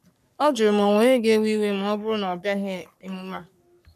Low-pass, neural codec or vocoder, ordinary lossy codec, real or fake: 14.4 kHz; codec, 44.1 kHz, 3.4 kbps, Pupu-Codec; none; fake